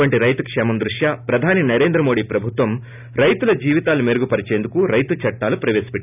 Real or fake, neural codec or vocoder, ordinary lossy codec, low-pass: real; none; none; 3.6 kHz